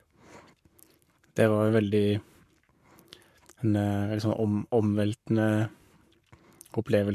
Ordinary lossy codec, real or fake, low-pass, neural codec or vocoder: MP3, 96 kbps; fake; 14.4 kHz; codec, 44.1 kHz, 7.8 kbps, Pupu-Codec